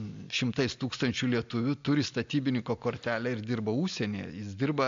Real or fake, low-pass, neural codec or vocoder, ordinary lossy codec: real; 7.2 kHz; none; AAC, 64 kbps